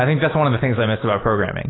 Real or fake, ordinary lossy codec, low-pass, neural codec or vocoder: real; AAC, 16 kbps; 7.2 kHz; none